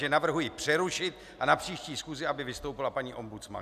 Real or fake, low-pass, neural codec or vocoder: real; 14.4 kHz; none